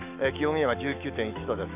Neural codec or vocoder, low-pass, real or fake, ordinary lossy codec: autoencoder, 48 kHz, 128 numbers a frame, DAC-VAE, trained on Japanese speech; 3.6 kHz; fake; MP3, 32 kbps